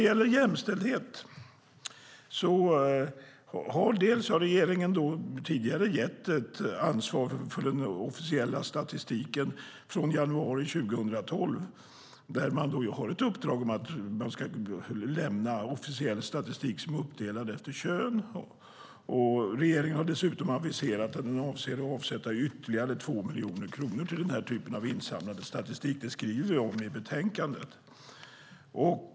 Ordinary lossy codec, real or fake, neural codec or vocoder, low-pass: none; real; none; none